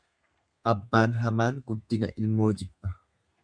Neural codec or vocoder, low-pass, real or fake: codec, 32 kHz, 1.9 kbps, SNAC; 9.9 kHz; fake